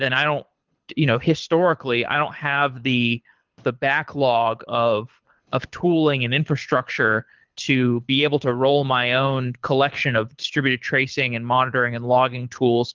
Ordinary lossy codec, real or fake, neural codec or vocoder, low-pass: Opus, 32 kbps; fake; codec, 24 kHz, 6 kbps, HILCodec; 7.2 kHz